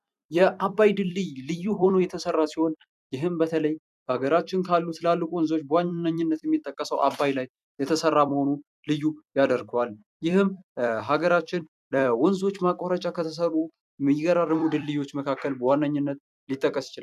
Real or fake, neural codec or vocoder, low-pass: fake; vocoder, 44.1 kHz, 128 mel bands every 256 samples, BigVGAN v2; 14.4 kHz